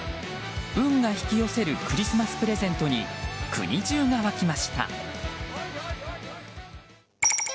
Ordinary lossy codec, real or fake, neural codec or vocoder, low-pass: none; real; none; none